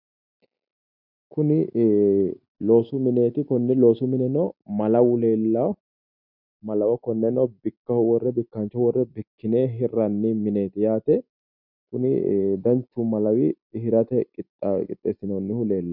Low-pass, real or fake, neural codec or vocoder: 5.4 kHz; real; none